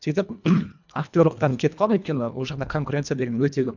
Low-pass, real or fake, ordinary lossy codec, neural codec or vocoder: 7.2 kHz; fake; none; codec, 24 kHz, 1.5 kbps, HILCodec